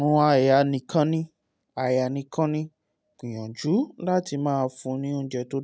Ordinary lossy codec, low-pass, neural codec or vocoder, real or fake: none; none; none; real